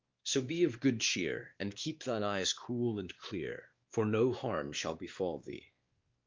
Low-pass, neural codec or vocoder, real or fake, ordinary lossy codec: 7.2 kHz; codec, 16 kHz, 2 kbps, X-Codec, WavLM features, trained on Multilingual LibriSpeech; fake; Opus, 32 kbps